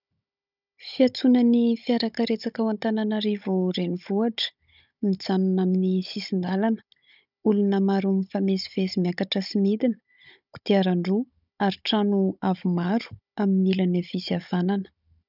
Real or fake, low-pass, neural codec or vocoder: fake; 5.4 kHz; codec, 16 kHz, 16 kbps, FunCodec, trained on Chinese and English, 50 frames a second